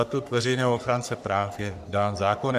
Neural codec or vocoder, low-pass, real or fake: codec, 44.1 kHz, 3.4 kbps, Pupu-Codec; 14.4 kHz; fake